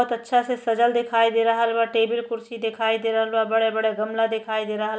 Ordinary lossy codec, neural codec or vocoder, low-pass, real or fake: none; none; none; real